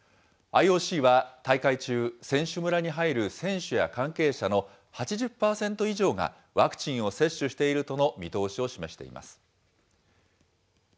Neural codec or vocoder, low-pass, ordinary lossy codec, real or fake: none; none; none; real